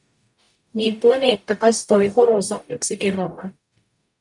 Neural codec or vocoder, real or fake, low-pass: codec, 44.1 kHz, 0.9 kbps, DAC; fake; 10.8 kHz